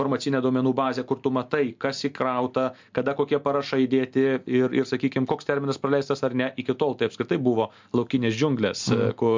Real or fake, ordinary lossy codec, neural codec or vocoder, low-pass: real; MP3, 64 kbps; none; 7.2 kHz